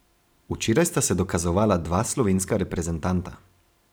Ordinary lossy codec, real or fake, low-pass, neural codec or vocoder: none; fake; none; vocoder, 44.1 kHz, 128 mel bands every 512 samples, BigVGAN v2